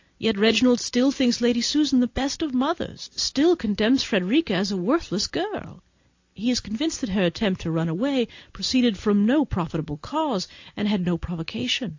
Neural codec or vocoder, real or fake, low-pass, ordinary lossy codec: none; real; 7.2 kHz; AAC, 48 kbps